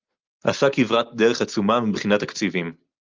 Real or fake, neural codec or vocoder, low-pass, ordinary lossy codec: real; none; 7.2 kHz; Opus, 24 kbps